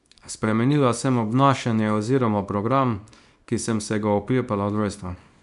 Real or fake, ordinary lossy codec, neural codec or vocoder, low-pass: fake; none; codec, 24 kHz, 0.9 kbps, WavTokenizer, small release; 10.8 kHz